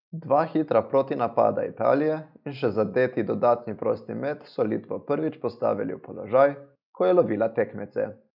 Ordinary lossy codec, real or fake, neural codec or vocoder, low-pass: none; real; none; 5.4 kHz